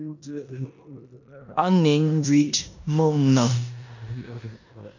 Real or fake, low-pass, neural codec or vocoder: fake; 7.2 kHz; codec, 16 kHz in and 24 kHz out, 0.9 kbps, LongCat-Audio-Codec, four codebook decoder